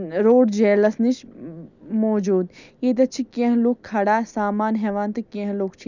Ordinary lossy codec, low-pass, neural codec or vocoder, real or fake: none; 7.2 kHz; none; real